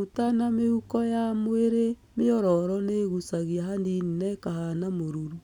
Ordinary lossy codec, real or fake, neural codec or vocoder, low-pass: none; real; none; 19.8 kHz